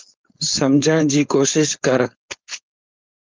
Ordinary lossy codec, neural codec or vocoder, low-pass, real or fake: Opus, 24 kbps; codec, 16 kHz, 4.8 kbps, FACodec; 7.2 kHz; fake